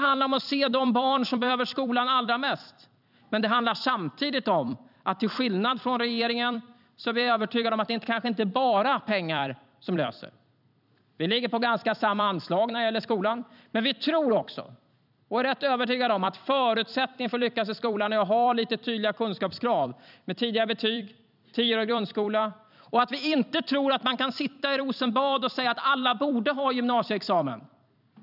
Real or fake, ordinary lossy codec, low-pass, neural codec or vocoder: fake; none; 5.4 kHz; vocoder, 44.1 kHz, 128 mel bands every 256 samples, BigVGAN v2